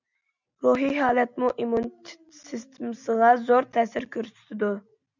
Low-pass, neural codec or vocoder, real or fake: 7.2 kHz; none; real